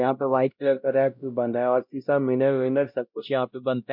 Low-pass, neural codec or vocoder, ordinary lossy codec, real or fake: 5.4 kHz; codec, 16 kHz, 0.5 kbps, X-Codec, WavLM features, trained on Multilingual LibriSpeech; MP3, 48 kbps; fake